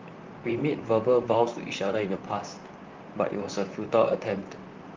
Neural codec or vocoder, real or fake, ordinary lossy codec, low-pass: vocoder, 44.1 kHz, 128 mel bands, Pupu-Vocoder; fake; Opus, 24 kbps; 7.2 kHz